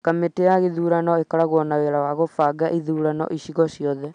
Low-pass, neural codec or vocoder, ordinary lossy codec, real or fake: 9.9 kHz; none; none; real